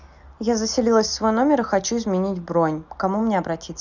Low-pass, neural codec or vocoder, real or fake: 7.2 kHz; none; real